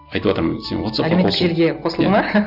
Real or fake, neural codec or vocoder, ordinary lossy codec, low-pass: real; none; Opus, 64 kbps; 5.4 kHz